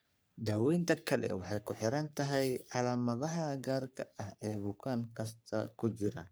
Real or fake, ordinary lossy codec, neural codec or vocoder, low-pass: fake; none; codec, 44.1 kHz, 3.4 kbps, Pupu-Codec; none